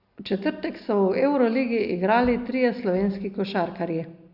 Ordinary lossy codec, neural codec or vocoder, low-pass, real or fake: Opus, 64 kbps; none; 5.4 kHz; real